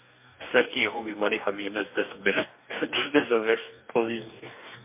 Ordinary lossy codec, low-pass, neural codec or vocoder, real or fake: MP3, 32 kbps; 3.6 kHz; codec, 44.1 kHz, 2.6 kbps, DAC; fake